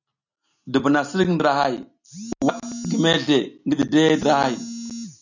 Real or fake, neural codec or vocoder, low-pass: real; none; 7.2 kHz